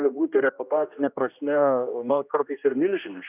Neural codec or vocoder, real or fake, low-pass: codec, 16 kHz, 1 kbps, X-Codec, HuBERT features, trained on general audio; fake; 3.6 kHz